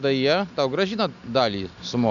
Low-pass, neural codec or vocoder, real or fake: 7.2 kHz; none; real